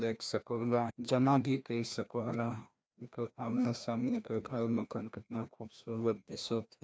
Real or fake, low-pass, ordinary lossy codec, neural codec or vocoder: fake; none; none; codec, 16 kHz, 1 kbps, FreqCodec, larger model